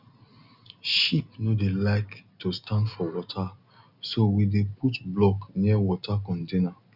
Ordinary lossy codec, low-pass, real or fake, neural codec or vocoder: none; 5.4 kHz; real; none